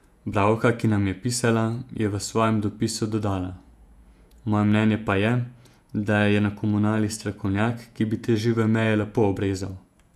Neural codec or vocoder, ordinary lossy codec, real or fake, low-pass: vocoder, 48 kHz, 128 mel bands, Vocos; none; fake; 14.4 kHz